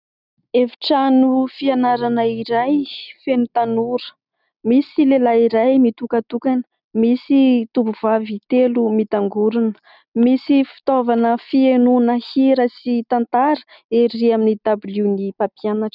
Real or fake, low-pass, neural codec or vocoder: real; 5.4 kHz; none